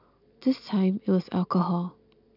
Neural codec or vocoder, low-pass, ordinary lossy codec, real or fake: none; 5.4 kHz; none; real